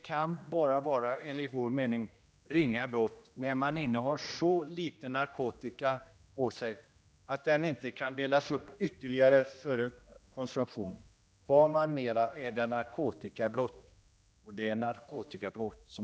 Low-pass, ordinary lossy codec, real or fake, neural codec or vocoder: none; none; fake; codec, 16 kHz, 1 kbps, X-Codec, HuBERT features, trained on general audio